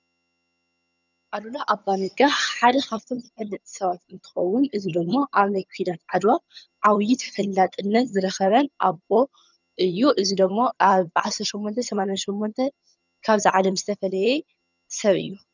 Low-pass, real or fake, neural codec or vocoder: 7.2 kHz; fake; vocoder, 22.05 kHz, 80 mel bands, HiFi-GAN